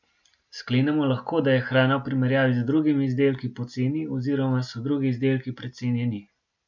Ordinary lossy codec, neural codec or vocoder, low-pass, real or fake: none; none; 7.2 kHz; real